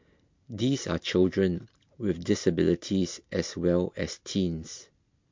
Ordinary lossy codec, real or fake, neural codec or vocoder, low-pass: MP3, 48 kbps; real; none; 7.2 kHz